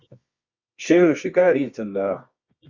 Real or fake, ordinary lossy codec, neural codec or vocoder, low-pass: fake; Opus, 64 kbps; codec, 24 kHz, 0.9 kbps, WavTokenizer, medium music audio release; 7.2 kHz